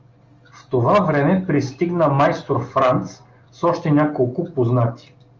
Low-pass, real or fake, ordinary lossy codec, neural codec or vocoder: 7.2 kHz; real; Opus, 32 kbps; none